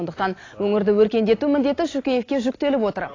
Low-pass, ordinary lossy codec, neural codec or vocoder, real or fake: 7.2 kHz; AAC, 32 kbps; none; real